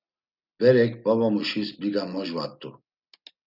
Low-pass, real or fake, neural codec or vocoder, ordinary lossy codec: 5.4 kHz; real; none; Opus, 64 kbps